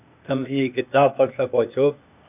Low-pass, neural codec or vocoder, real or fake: 3.6 kHz; codec, 16 kHz, 0.8 kbps, ZipCodec; fake